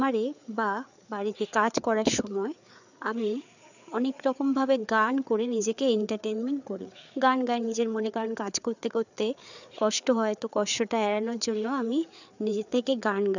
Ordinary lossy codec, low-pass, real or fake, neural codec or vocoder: none; 7.2 kHz; fake; codec, 16 kHz, 4 kbps, FreqCodec, larger model